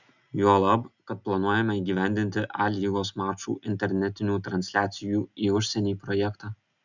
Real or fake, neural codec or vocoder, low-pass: real; none; 7.2 kHz